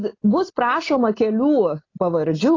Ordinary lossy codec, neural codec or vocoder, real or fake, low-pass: AAC, 32 kbps; none; real; 7.2 kHz